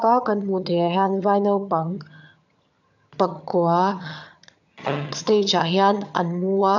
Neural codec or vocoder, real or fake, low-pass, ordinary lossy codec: vocoder, 22.05 kHz, 80 mel bands, HiFi-GAN; fake; 7.2 kHz; none